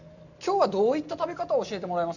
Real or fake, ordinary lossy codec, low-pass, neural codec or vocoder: real; none; 7.2 kHz; none